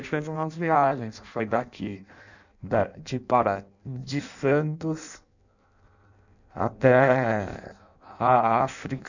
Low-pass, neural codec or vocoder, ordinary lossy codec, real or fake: 7.2 kHz; codec, 16 kHz in and 24 kHz out, 0.6 kbps, FireRedTTS-2 codec; none; fake